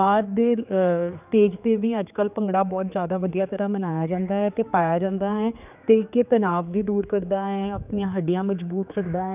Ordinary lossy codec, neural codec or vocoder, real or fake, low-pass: none; codec, 16 kHz, 4 kbps, X-Codec, HuBERT features, trained on general audio; fake; 3.6 kHz